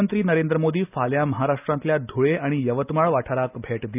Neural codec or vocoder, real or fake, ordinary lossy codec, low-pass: none; real; none; 3.6 kHz